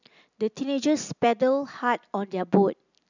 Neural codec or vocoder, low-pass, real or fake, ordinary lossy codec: none; 7.2 kHz; real; none